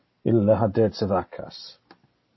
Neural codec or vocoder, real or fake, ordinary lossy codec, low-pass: none; real; MP3, 24 kbps; 7.2 kHz